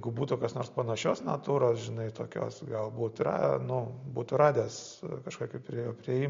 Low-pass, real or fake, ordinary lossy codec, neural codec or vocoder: 7.2 kHz; real; MP3, 48 kbps; none